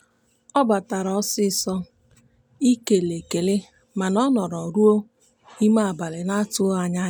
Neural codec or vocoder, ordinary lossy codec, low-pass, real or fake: none; none; none; real